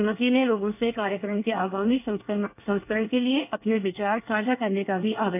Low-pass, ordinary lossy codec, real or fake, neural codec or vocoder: 3.6 kHz; none; fake; codec, 24 kHz, 1 kbps, SNAC